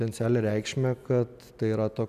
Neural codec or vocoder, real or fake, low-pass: none; real; 14.4 kHz